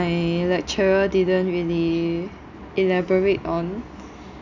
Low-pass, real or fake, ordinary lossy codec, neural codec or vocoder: 7.2 kHz; real; none; none